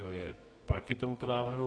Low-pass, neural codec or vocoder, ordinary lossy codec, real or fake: 9.9 kHz; codec, 24 kHz, 0.9 kbps, WavTokenizer, medium music audio release; AAC, 32 kbps; fake